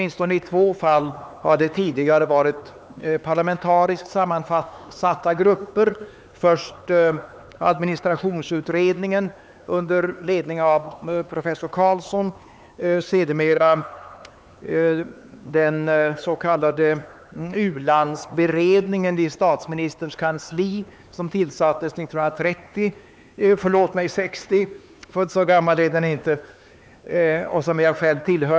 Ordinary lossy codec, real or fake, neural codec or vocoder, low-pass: none; fake; codec, 16 kHz, 4 kbps, X-Codec, HuBERT features, trained on LibriSpeech; none